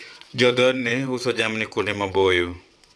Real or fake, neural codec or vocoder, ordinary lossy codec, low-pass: fake; vocoder, 22.05 kHz, 80 mel bands, WaveNeXt; none; none